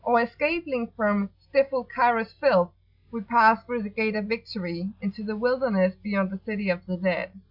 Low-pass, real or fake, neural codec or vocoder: 5.4 kHz; real; none